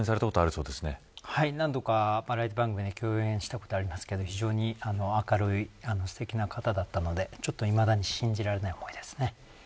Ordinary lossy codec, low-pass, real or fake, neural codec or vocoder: none; none; real; none